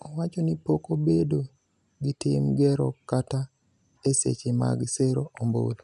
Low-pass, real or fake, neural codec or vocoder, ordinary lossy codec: 10.8 kHz; real; none; none